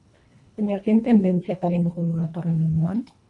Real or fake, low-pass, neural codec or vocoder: fake; 10.8 kHz; codec, 24 kHz, 1.5 kbps, HILCodec